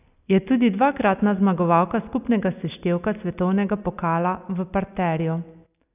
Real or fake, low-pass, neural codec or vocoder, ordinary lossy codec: real; 3.6 kHz; none; none